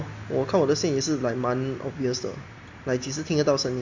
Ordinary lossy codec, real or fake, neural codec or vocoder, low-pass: MP3, 48 kbps; real; none; 7.2 kHz